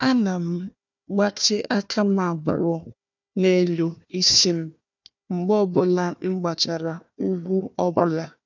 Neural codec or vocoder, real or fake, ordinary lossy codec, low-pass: codec, 16 kHz, 1 kbps, FunCodec, trained on Chinese and English, 50 frames a second; fake; none; 7.2 kHz